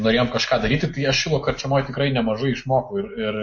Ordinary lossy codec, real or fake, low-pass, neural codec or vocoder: MP3, 32 kbps; real; 7.2 kHz; none